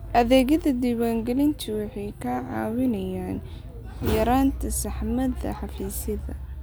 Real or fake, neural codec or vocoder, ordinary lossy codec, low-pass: real; none; none; none